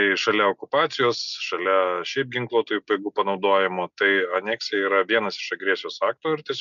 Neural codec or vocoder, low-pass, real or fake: none; 7.2 kHz; real